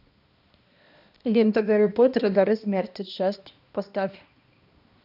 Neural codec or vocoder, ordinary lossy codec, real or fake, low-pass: codec, 16 kHz, 1 kbps, X-Codec, HuBERT features, trained on balanced general audio; AAC, 48 kbps; fake; 5.4 kHz